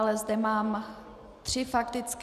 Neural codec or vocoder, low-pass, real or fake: none; 14.4 kHz; real